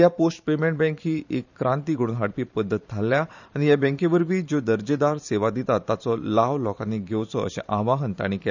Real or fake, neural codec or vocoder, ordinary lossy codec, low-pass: fake; vocoder, 44.1 kHz, 128 mel bands every 512 samples, BigVGAN v2; none; 7.2 kHz